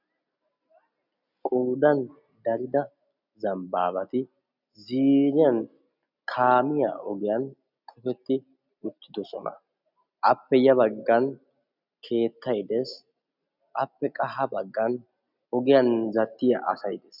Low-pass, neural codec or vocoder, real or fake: 5.4 kHz; none; real